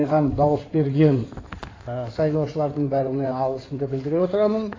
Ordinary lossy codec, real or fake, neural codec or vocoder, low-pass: AAC, 32 kbps; fake; codec, 16 kHz in and 24 kHz out, 2.2 kbps, FireRedTTS-2 codec; 7.2 kHz